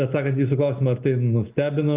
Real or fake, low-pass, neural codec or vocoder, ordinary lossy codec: real; 3.6 kHz; none; Opus, 24 kbps